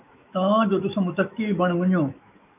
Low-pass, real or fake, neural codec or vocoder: 3.6 kHz; fake; autoencoder, 48 kHz, 128 numbers a frame, DAC-VAE, trained on Japanese speech